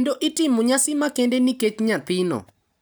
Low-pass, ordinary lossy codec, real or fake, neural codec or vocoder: none; none; real; none